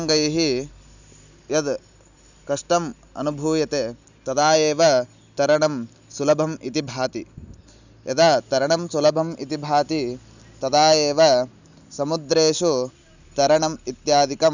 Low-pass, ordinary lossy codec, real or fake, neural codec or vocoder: 7.2 kHz; none; real; none